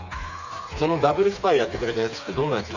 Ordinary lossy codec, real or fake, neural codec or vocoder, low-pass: none; fake; codec, 16 kHz, 4 kbps, FreqCodec, smaller model; 7.2 kHz